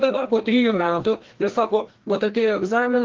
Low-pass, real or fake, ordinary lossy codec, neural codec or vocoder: 7.2 kHz; fake; Opus, 16 kbps; codec, 16 kHz, 1 kbps, FreqCodec, larger model